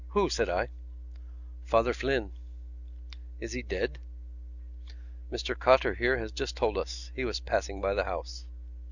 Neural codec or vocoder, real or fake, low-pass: none; real; 7.2 kHz